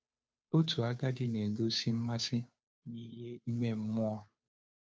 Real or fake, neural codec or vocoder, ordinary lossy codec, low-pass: fake; codec, 16 kHz, 2 kbps, FunCodec, trained on Chinese and English, 25 frames a second; none; none